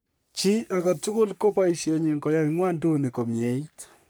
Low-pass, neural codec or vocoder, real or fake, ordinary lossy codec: none; codec, 44.1 kHz, 3.4 kbps, Pupu-Codec; fake; none